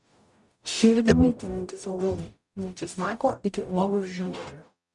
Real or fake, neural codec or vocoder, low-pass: fake; codec, 44.1 kHz, 0.9 kbps, DAC; 10.8 kHz